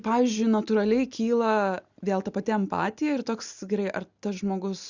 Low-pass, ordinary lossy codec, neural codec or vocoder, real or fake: 7.2 kHz; Opus, 64 kbps; none; real